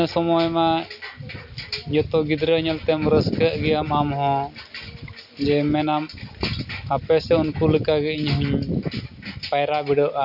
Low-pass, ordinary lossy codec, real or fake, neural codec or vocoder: 5.4 kHz; none; real; none